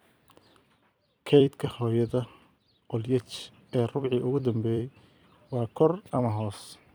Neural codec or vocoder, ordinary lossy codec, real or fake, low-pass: vocoder, 44.1 kHz, 128 mel bands every 256 samples, BigVGAN v2; none; fake; none